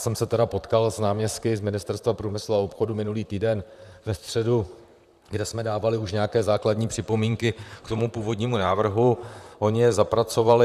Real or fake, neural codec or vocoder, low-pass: fake; vocoder, 44.1 kHz, 128 mel bands, Pupu-Vocoder; 14.4 kHz